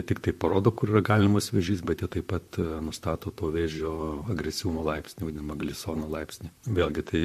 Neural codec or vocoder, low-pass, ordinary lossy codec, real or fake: vocoder, 44.1 kHz, 128 mel bands, Pupu-Vocoder; 14.4 kHz; MP3, 64 kbps; fake